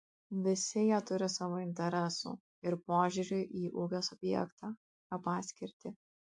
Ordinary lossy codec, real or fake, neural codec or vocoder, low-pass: MP3, 64 kbps; real; none; 10.8 kHz